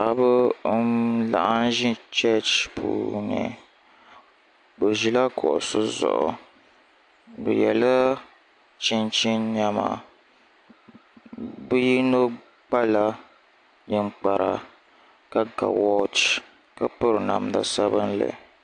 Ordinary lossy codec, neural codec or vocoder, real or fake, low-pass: AAC, 64 kbps; none; real; 9.9 kHz